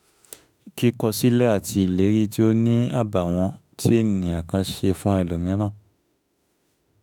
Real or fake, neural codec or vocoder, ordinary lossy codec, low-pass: fake; autoencoder, 48 kHz, 32 numbers a frame, DAC-VAE, trained on Japanese speech; none; none